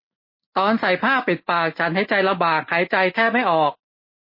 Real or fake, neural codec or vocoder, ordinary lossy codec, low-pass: real; none; MP3, 24 kbps; 5.4 kHz